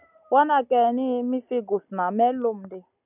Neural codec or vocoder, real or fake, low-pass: none; real; 3.6 kHz